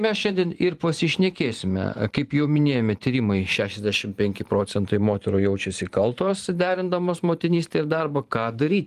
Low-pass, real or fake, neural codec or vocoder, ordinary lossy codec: 14.4 kHz; fake; vocoder, 44.1 kHz, 128 mel bands every 512 samples, BigVGAN v2; Opus, 24 kbps